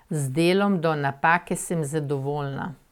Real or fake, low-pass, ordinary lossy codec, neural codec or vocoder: real; 19.8 kHz; none; none